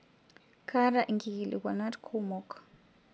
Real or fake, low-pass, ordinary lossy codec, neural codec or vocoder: real; none; none; none